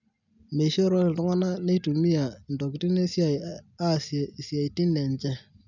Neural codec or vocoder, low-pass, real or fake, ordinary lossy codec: none; 7.2 kHz; real; none